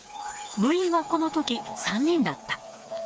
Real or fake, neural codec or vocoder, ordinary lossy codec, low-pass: fake; codec, 16 kHz, 4 kbps, FreqCodec, smaller model; none; none